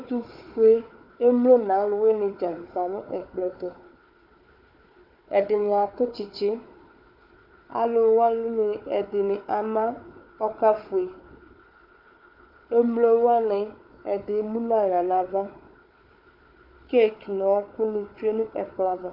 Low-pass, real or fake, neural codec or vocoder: 5.4 kHz; fake; codec, 16 kHz, 4 kbps, FunCodec, trained on Chinese and English, 50 frames a second